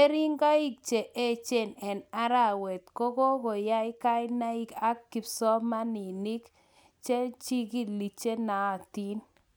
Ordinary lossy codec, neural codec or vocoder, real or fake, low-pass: none; none; real; none